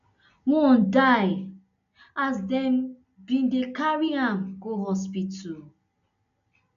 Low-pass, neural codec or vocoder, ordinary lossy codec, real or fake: 7.2 kHz; none; none; real